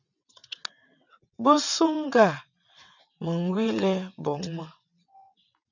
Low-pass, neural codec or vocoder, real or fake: 7.2 kHz; vocoder, 22.05 kHz, 80 mel bands, WaveNeXt; fake